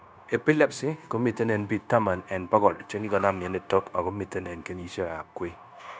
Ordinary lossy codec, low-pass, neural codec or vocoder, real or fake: none; none; codec, 16 kHz, 0.9 kbps, LongCat-Audio-Codec; fake